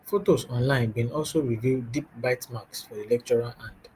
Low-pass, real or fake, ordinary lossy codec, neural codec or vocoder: 14.4 kHz; real; Opus, 32 kbps; none